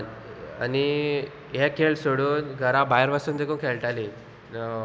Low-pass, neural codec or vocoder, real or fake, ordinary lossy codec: none; none; real; none